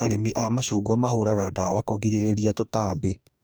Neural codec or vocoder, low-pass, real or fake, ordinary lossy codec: codec, 44.1 kHz, 2.6 kbps, DAC; none; fake; none